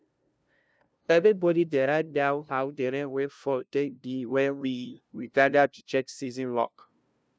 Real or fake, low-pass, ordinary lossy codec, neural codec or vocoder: fake; none; none; codec, 16 kHz, 0.5 kbps, FunCodec, trained on LibriTTS, 25 frames a second